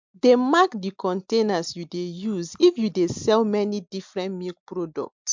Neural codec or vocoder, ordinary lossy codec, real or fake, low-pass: none; MP3, 64 kbps; real; 7.2 kHz